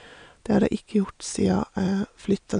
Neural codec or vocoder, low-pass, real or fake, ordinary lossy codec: none; 9.9 kHz; real; none